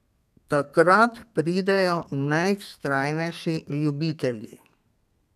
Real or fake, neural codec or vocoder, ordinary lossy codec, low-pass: fake; codec, 32 kHz, 1.9 kbps, SNAC; none; 14.4 kHz